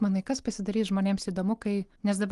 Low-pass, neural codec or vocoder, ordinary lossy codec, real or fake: 10.8 kHz; none; Opus, 24 kbps; real